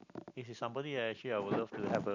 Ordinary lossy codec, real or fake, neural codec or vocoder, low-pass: none; real; none; 7.2 kHz